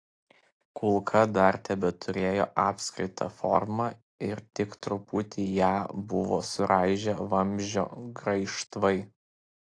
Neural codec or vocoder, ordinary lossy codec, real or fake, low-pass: none; AAC, 48 kbps; real; 9.9 kHz